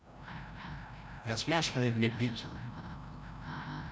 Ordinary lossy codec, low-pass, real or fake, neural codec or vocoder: none; none; fake; codec, 16 kHz, 0.5 kbps, FreqCodec, larger model